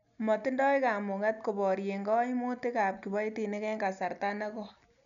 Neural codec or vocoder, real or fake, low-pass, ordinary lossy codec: none; real; 7.2 kHz; none